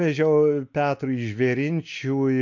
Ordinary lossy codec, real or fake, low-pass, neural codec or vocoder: MP3, 64 kbps; real; 7.2 kHz; none